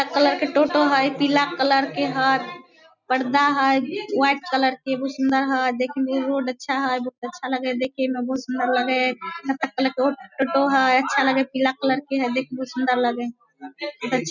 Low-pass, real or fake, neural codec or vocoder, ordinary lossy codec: 7.2 kHz; real; none; none